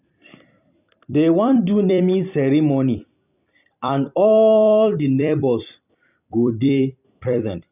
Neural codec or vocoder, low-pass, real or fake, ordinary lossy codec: vocoder, 44.1 kHz, 128 mel bands every 256 samples, BigVGAN v2; 3.6 kHz; fake; none